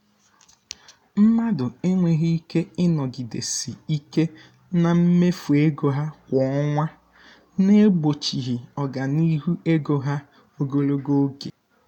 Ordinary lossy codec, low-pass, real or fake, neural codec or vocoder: none; 19.8 kHz; real; none